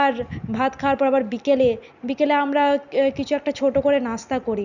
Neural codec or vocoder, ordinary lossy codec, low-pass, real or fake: none; none; 7.2 kHz; real